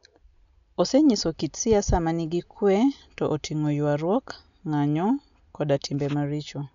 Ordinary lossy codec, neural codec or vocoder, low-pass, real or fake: none; none; 7.2 kHz; real